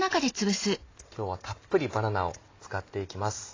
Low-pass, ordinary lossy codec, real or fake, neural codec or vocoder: 7.2 kHz; AAC, 32 kbps; real; none